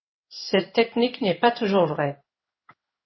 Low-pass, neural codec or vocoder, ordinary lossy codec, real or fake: 7.2 kHz; codec, 16 kHz in and 24 kHz out, 1 kbps, XY-Tokenizer; MP3, 24 kbps; fake